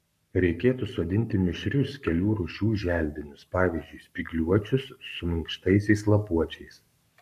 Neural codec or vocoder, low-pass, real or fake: codec, 44.1 kHz, 7.8 kbps, Pupu-Codec; 14.4 kHz; fake